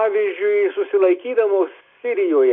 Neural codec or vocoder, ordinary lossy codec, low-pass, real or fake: none; MP3, 48 kbps; 7.2 kHz; real